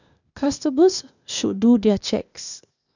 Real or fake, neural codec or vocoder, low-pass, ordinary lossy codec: fake; codec, 16 kHz, 0.8 kbps, ZipCodec; 7.2 kHz; none